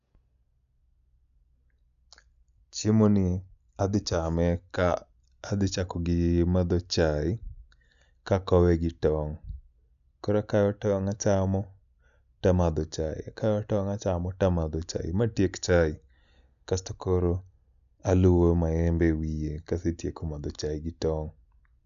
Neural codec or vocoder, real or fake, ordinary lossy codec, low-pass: none; real; none; 7.2 kHz